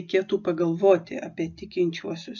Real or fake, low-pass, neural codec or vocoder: real; 7.2 kHz; none